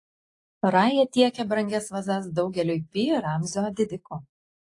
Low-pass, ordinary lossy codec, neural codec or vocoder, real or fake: 10.8 kHz; AAC, 48 kbps; none; real